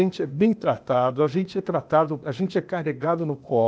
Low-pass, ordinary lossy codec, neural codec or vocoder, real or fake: none; none; codec, 16 kHz, 0.8 kbps, ZipCodec; fake